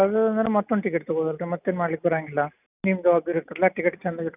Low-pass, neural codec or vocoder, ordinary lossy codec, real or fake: 3.6 kHz; none; none; real